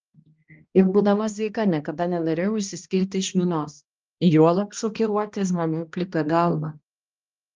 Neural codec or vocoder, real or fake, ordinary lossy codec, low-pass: codec, 16 kHz, 1 kbps, X-Codec, HuBERT features, trained on balanced general audio; fake; Opus, 24 kbps; 7.2 kHz